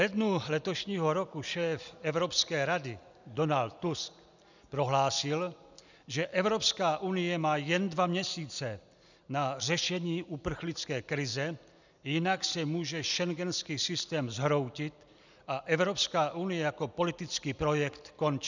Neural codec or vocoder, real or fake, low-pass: none; real; 7.2 kHz